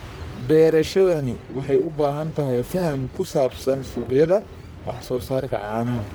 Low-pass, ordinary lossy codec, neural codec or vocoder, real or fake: none; none; codec, 44.1 kHz, 1.7 kbps, Pupu-Codec; fake